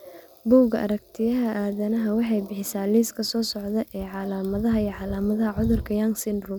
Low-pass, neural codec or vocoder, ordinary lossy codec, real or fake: none; none; none; real